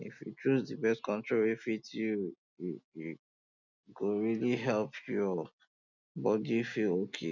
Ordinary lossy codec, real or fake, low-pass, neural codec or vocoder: none; real; 7.2 kHz; none